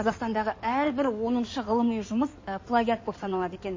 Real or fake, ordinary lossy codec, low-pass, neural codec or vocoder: fake; MP3, 32 kbps; 7.2 kHz; codec, 16 kHz in and 24 kHz out, 2.2 kbps, FireRedTTS-2 codec